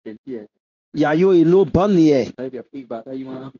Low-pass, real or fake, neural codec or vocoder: 7.2 kHz; fake; codec, 16 kHz in and 24 kHz out, 1 kbps, XY-Tokenizer